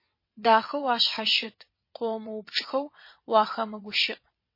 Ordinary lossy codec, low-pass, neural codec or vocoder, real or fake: MP3, 24 kbps; 5.4 kHz; vocoder, 22.05 kHz, 80 mel bands, WaveNeXt; fake